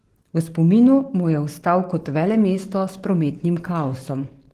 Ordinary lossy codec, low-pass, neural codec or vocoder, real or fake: Opus, 16 kbps; 14.4 kHz; codec, 44.1 kHz, 7.8 kbps, DAC; fake